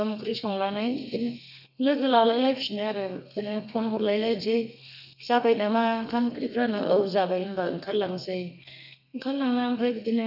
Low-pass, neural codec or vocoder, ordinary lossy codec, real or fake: 5.4 kHz; codec, 32 kHz, 1.9 kbps, SNAC; none; fake